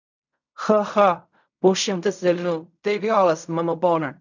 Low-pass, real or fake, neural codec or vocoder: 7.2 kHz; fake; codec, 16 kHz in and 24 kHz out, 0.4 kbps, LongCat-Audio-Codec, fine tuned four codebook decoder